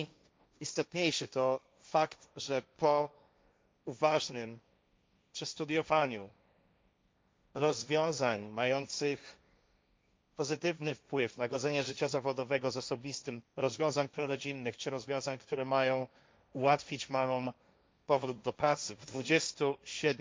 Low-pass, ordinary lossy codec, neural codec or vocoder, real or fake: none; none; codec, 16 kHz, 1.1 kbps, Voila-Tokenizer; fake